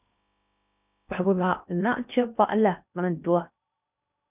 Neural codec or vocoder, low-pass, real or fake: codec, 16 kHz in and 24 kHz out, 0.6 kbps, FocalCodec, streaming, 2048 codes; 3.6 kHz; fake